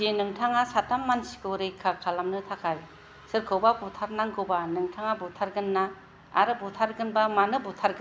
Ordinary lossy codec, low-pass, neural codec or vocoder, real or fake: none; none; none; real